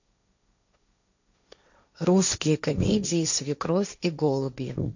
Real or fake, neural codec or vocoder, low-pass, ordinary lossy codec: fake; codec, 16 kHz, 1.1 kbps, Voila-Tokenizer; 7.2 kHz; none